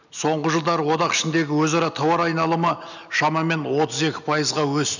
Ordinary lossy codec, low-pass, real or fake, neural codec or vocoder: none; 7.2 kHz; real; none